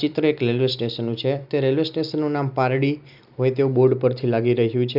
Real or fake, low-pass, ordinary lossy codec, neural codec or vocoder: real; 5.4 kHz; none; none